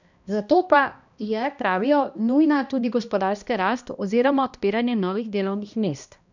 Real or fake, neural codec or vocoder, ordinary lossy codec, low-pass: fake; codec, 16 kHz, 1 kbps, X-Codec, HuBERT features, trained on balanced general audio; none; 7.2 kHz